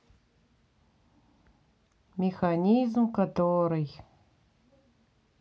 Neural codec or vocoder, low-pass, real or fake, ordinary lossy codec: none; none; real; none